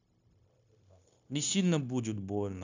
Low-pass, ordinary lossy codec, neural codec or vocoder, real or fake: 7.2 kHz; none; codec, 16 kHz, 0.9 kbps, LongCat-Audio-Codec; fake